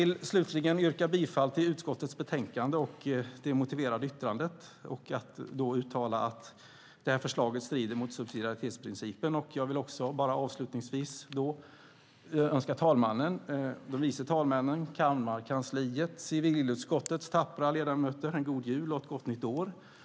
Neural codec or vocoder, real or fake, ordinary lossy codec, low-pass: none; real; none; none